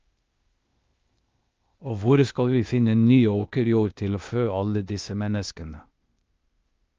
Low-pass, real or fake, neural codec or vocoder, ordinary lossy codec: 7.2 kHz; fake; codec, 16 kHz, 0.8 kbps, ZipCodec; Opus, 24 kbps